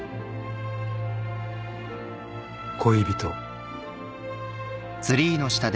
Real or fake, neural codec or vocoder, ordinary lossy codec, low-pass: real; none; none; none